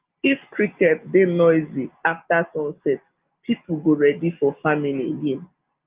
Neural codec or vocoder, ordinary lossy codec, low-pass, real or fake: none; Opus, 32 kbps; 3.6 kHz; real